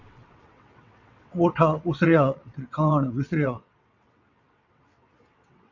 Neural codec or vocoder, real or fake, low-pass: vocoder, 22.05 kHz, 80 mel bands, WaveNeXt; fake; 7.2 kHz